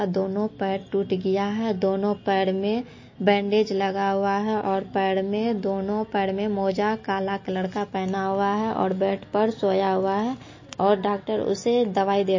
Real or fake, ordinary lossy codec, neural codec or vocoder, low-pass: real; MP3, 32 kbps; none; 7.2 kHz